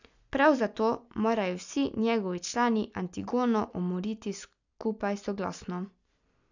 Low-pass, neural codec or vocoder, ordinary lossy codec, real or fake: 7.2 kHz; none; none; real